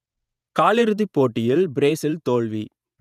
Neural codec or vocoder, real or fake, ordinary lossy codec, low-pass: vocoder, 48 kHz, 128 mel bands, Vocos; fake; none; 14.4 kHz